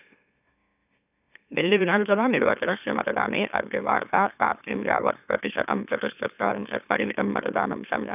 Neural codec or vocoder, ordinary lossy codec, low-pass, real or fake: autoencoder, 44.1 kHz, a latent of 192 numbers a frame, MeloTTS; none; 3.6 kHz; fake